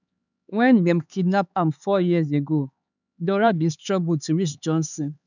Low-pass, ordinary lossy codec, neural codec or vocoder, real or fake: 7.2 kHz; none; codec, 16 kHz, 4 kbps, X-Codec, HuBERT features, trained on LibriSpeech; fake